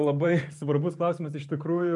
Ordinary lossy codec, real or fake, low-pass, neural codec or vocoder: MP3, 64 kbps; fake; 10.8 kHz; vocoder, 44.1 kHz, 128 mel bands every 512 samples, BigVGAN v2